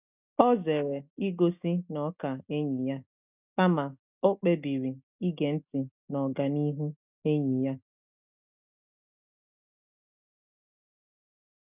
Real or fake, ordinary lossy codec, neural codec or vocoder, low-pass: real; none; none; 3.6 kHz